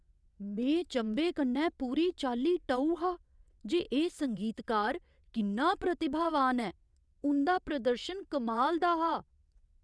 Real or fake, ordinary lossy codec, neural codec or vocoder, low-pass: fake; none; vocoder, 22.05 kHz, 80 mel bands, WaveNeXt; none